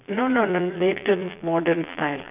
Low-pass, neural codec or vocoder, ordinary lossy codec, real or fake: 3.6 kHz; vocoder, 22.05 kHz, 80 mel bands, Vocos; none; fake